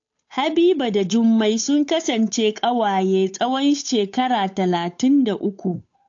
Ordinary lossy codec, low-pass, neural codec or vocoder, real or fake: AAC, 48 kbps; 7.2 kHz; codec, 16 kHz, 8 kbps, FunCodec, trained on Chinese and English, 25 frames a second; fake